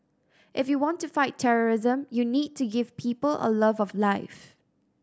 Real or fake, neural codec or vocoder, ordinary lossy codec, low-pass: real; none; none; none